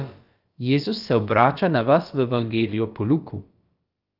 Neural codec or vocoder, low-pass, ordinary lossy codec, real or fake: codec, 16 kHz, about 1 kbps, DyCAST, with the encoder's durations; 5.4 kHz; Opus, 32 kbps; fake